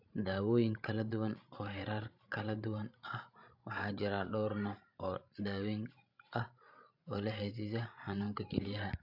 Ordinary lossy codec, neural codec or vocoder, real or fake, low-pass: AAC, 48 kbps; codec, 16 kHz, 16 kbps, FreqCodec, larger model; fake; 5.4 kHz